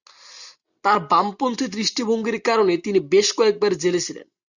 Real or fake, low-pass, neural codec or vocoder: real; 7.2 kHz; none